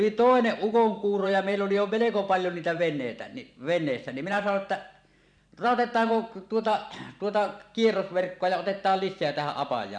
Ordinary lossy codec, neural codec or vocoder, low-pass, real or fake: none; vocoder, 44.1 kHz, 128 mel bands every 512 samples, BigVGAN v2; 9.9 kHz; fake